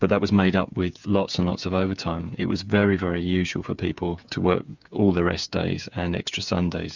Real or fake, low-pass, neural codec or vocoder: fake; 7.2 kHz; codec, 16 kHz, 8 kbps, FreqCodec, smaller model